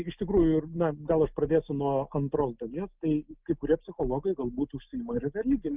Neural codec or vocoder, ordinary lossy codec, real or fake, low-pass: none; Opus, 24 kbps; real; 3.6 kHz